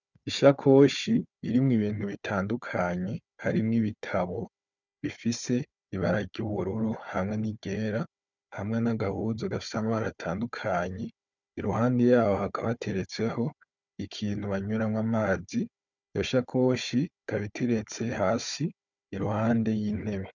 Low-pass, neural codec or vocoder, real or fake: 7.2 kHz; codec, 16 kHz, 4 kbps, FunCodec, trained on Chinese and English, 50 frames a second; fake